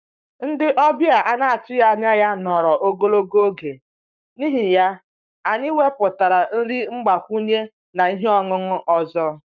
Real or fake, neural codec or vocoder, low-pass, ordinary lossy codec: fake; codec, 44.1 kHz, 7.8 kbps, Pupu-Codec; 7.2 kHz; none